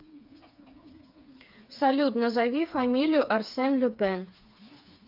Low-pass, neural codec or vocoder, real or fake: 5.4 kHz; codec, 16 kHz, 4 kbps, FreqCodec, smaller model; fake